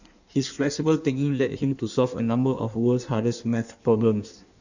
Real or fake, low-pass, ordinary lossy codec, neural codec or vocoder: fake; 7.2 kHz; none; codec, 16 kHz in and 24 kHz out, 1.1 kbps, FireRedTTS-2 codec